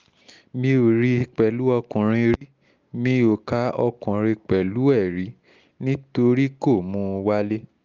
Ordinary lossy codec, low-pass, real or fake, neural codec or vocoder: Opus, 32 kbps; 7.2 kHz; real; none